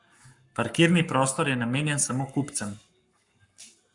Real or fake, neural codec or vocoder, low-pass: fake; codec, 44.1 kHz, 7.8 kbps, Pupu-Codec; 10.8 kHz